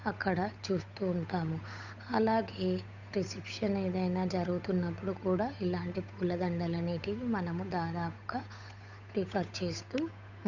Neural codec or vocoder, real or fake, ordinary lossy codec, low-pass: codec, 16 kHz, 8 kbps, FunCodec, trained on Chinese and English, 25 frames a second; fake; none; 7.2 kHz